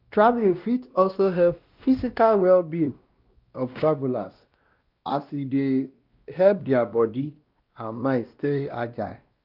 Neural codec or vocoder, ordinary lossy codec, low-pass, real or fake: codec, 16 kHz, 1 kbps, X-Codec, WavLM features, trained on Multilingual LibriSpeech; Opus, 24 kbps; 5.4 kHz; fake